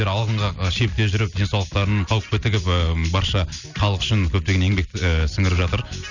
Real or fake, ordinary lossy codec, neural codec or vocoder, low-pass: real; none; none; 7.2 kHz